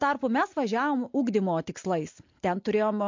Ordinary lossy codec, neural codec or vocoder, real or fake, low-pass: MP3, 48 kbps; none; real; 7.2 kHz